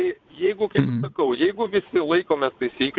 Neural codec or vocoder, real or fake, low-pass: vocoder, 22.05 kHz, 80 mel bands, WaveNeXt; fake; 7.2 kHz